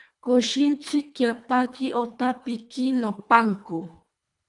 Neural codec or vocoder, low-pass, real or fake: codec, 24 kHz, 1.5 kbps, HILCodec; 10.8 kHz; fake